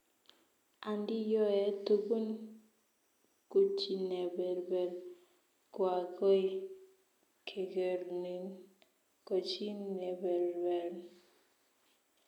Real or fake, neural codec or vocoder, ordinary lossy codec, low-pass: real; none; none; 19.8 kHz